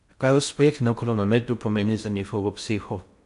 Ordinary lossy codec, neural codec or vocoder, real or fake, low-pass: none; codec, 16 kHz in and 24 kHz out, 0.6 kbps, FocalCodec, streaming, 4096 codes; fake; 10.8 kHz